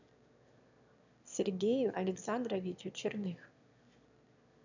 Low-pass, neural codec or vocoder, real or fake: 7.2 kHz; autoencoder, 22.05 kHz, a latent of 192 numbers a frame, VITS, trained on one speaker; fake